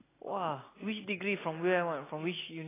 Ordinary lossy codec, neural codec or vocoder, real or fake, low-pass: AAC, 16 kbps; none; real; 3.6 kHz